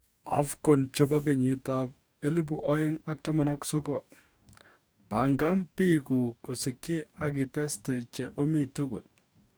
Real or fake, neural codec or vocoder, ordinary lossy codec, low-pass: fake; codec, 44.1 kHz, 2.6 kbps, DAC; none; none